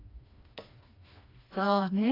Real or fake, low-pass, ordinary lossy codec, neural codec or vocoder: fake; 5.4 kHz; AAC, 24 kbps; codec, 16 kHz, 2 kbps, FreqCodec, smaller model